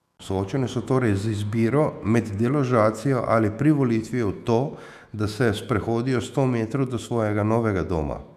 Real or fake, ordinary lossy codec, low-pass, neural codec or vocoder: fake; none; 14.4 kHz; autoencoder, 48 kHz, 128 numbers a frame, DAC-VAE, trained on Japanese speech